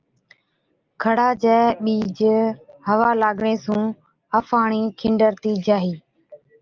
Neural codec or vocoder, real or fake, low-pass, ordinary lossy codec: none; real; 7.2 kHz; Opus, 24 kbps